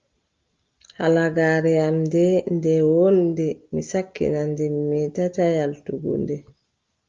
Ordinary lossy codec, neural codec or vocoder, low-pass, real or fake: Opus, 32 kbps; none; 7.2 kHz; real